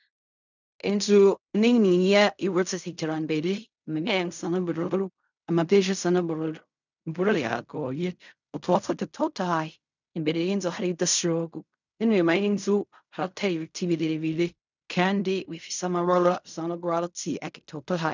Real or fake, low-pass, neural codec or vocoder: fake; 7.2 kHz; codec, 16 kHz in and 24 kHz out, 0.4 kbps, LongCat-Audio-Codec, fine tuned four codebook decoder